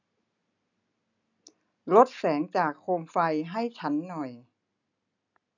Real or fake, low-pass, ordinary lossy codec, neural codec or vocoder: real; 7.2 kHz; none; none